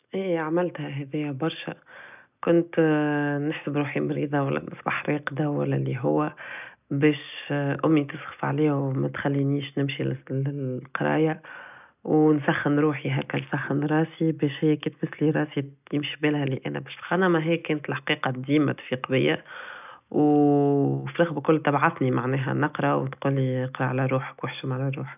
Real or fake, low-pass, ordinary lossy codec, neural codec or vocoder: real; 3.6 kHz; none; none